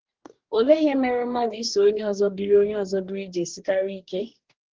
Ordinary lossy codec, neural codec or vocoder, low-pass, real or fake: Opus, 16 kbps; codec, 44.1 kHz, 2.6 kbps, DAC; 7.2 kHz; fake